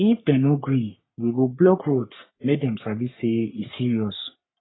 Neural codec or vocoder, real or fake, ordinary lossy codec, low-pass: codec, 44.1 kHz, 3.4 kbps, Pupu-Codec; fake; AAC, 16 kbps; 7.2 kHz